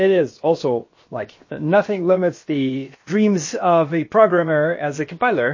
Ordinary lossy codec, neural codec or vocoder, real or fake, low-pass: MP3, 32 kbps; codec, 16 kHz, about 1 kbps, DyCAST, with the encoder's durations; fake; 7.2 kHz